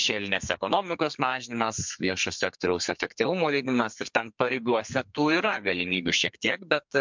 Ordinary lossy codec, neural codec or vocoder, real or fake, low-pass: MP3, 64 kbps; codec, 32 kHz, 1.9 kbps, SNAC; fake; 7.2 kHz